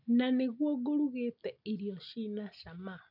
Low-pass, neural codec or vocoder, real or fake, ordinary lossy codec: 5.4 kHz; none; real; none